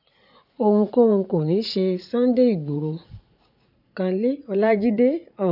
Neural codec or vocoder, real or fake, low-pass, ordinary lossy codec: vocoder, 44.1 kHz, 80 mel bands, Vocos; fake; 5.4 kHz; none